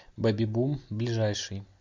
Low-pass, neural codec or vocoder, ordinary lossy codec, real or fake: 7.2 kHz; none; MP3, 64 kbps; real